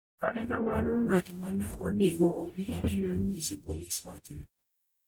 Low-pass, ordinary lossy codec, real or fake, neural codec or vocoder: none; none; fake; codec, 44.1 kHz, 0.9 kbps, DAC